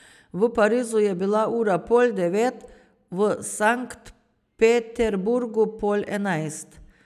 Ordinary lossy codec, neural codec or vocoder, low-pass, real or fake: none; none; 14.4 kHz; real